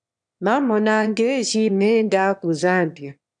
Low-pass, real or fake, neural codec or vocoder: 9.9 kHz; fake; autoencoder, 22.05 kHz, a latent of 192 numbers a frame, VITS, trained on one speaker